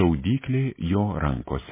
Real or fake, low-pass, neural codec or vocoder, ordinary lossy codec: real; 3.6 kHz; none; MP3, 16 kbps